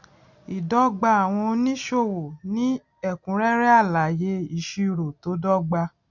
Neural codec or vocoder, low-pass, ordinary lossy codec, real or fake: none; 7.2 kHz; none; real